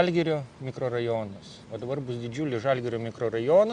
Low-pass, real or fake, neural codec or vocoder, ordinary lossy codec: 9.9 kHz; real; none; Opus, 64 kbps